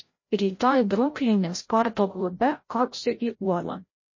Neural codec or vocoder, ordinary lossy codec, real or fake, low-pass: codec, 16 kHz, 0.5 kbps, FreqCodec, larger model; MP3, 32 kbps; fake; 7.2 kHz